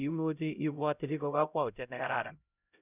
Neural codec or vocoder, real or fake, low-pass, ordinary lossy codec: codec, 16 kHz, 0.5 kbps, X-Codec, HuBERT features, trained on LibriSpeech; fake; 3.6 kHz; none